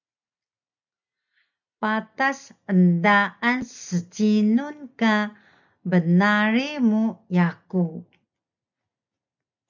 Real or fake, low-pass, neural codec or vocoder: real; 7.2 kHz; none